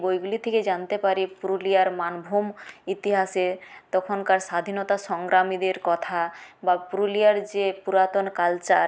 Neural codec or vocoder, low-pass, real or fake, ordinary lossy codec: none; none; real; none